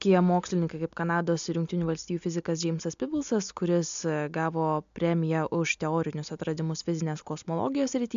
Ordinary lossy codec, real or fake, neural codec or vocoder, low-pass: MP3, 64 kbps; real; none; 7.2 kHz